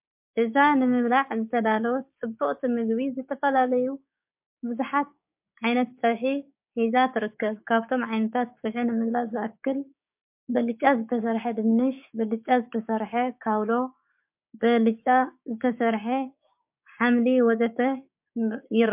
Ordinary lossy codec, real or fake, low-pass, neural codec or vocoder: MP3, 32 kbps; fake; 3.6 kHz; codec, 16 kHz, 6 kbps, DAC